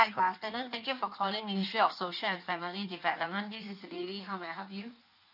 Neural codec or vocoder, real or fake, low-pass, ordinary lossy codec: codec, 16 kHz in and 24 kHz out, 1.1 kbps, FireRedTTS-2 codec; fake; 5.4 kHz; none